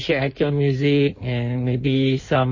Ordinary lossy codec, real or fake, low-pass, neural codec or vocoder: MP3, 32 kbps; fake; 7.2 kHz; codec, 16 kHz in and 24 kHz out, 1.1 kbps, FireRedTTS-2 codec